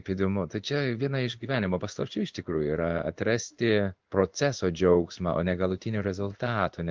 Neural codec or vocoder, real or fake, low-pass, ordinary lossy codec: codec, 16 kHz in and 24 kHz out, 1 kbps, XY-Tokenizer; fake; 7.2 kHz; Opus, 24 kbps